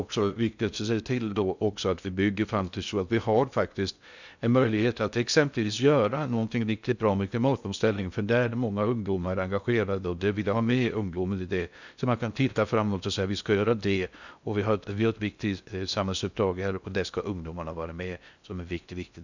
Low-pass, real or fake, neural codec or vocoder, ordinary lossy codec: 7.2 kHz; fake; codec, 16 kHz in and 24 kHz out, 0.6 kbps, FocalCodec, streaming, 2048 codes; none